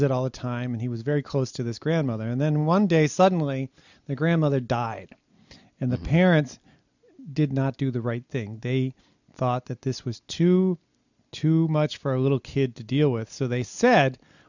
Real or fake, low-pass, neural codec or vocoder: real; 7.2 kHz; none